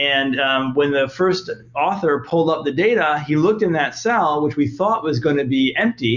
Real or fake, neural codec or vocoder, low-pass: real; none; 7.2 kHz